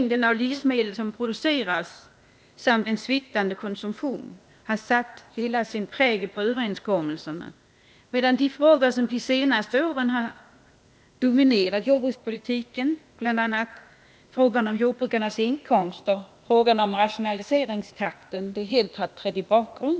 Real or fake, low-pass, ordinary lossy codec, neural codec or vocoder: fake; none; none; codec, 16 kHz, 0.8 kbps, ZipCodec